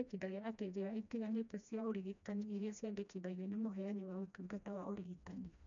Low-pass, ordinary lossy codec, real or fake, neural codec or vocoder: 7.2 kHz; none; fake; codec, 16 kHz, 1 kbps, FreqCodec, smaller model